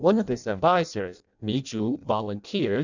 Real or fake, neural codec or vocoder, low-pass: fake; codec, 16 kHz in and 24 kHz out, 0.6 kbps, FireRedTTS-2 codec; 7.2 kHz